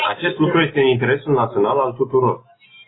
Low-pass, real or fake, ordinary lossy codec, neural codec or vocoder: 7.2 kHz; real; AAC, 16 kbps; none